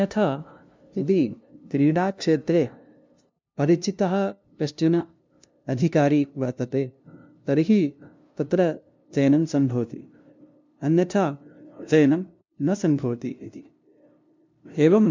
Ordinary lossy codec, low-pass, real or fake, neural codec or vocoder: MP3, 64 kbps; 7.2 kHz; fake; codec, 16 kHz, 0.5 kbps, FunCodec, trained on LibriTTS, 25 frames a second